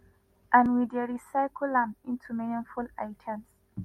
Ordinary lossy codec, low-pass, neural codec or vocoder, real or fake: MP3, 64 kbps; 19.8 kHz; none; real